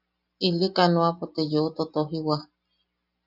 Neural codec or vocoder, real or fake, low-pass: none; real; 5.4 kHz